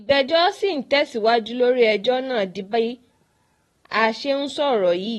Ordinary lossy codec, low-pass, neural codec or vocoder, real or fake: AAC, 32 kbps; 19.8 kHz; vocoder, 44.1 kHz, 128 mel bands every 256 samples, BigVGAN v2; fake